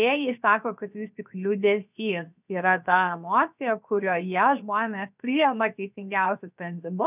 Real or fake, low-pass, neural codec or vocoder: fake; 3.6 kHz; codec, 16 kHz, 0.7 kbps, FocalCodec